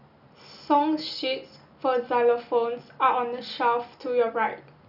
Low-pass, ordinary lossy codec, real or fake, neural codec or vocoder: 5.4 kHz; none; real; none